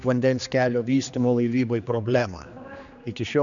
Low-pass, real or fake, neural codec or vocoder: 7.2 kHz; fake; codec, 16 kHz, 2 kbps, X-Codec, HuBERT features, trained on general audio